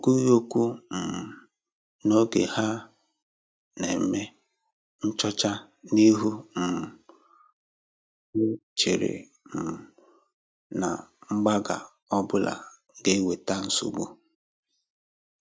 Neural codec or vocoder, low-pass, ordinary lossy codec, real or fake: none; none; none; real